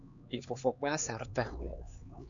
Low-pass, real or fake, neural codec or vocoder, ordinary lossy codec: 7.2 kHz; fake; codec, 16 kHz, 4 kbps, X-Codec, HuBERT features, trained on LibriSpeech; MP3, 64 kbps